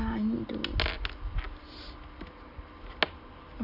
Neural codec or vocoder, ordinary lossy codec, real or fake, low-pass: none; none; real; 5.4 kHz